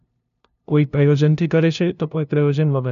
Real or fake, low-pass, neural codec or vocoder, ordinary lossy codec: fake; 7.2 kHz; codec, 16 kHz, 0.5 kbps, FunCodec, trained on LibriTTS, 25 frames a second; none